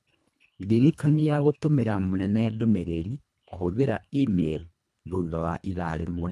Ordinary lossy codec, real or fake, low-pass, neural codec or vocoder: none; fake; none; codec, 24 kHz, 1.5 kbps, HILCodec